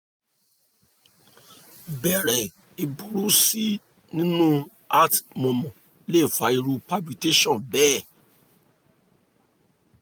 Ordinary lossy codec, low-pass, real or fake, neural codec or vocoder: none; none; real; none